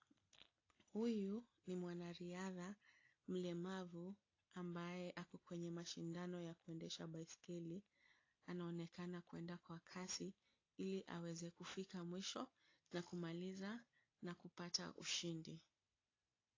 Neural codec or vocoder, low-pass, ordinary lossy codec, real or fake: none; 7.2 kHz; AAC, 32 kbps; real